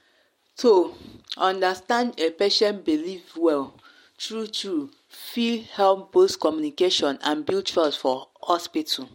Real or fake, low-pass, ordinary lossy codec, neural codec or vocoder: real; 19.8 kHz; MP3, 64 kbps; none